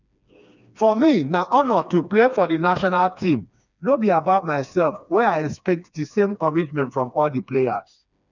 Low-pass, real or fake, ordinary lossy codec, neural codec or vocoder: 7.2 kHz; fake; none; codec, 16 kHz, 2 kbps, FreqCodec, smaller model